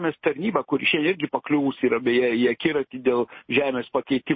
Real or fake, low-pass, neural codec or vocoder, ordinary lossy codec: real; 7.2 kHz; none; MP3, 24 kbps